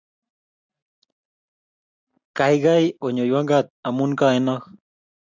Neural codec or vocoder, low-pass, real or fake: none; 7.2 kHz; real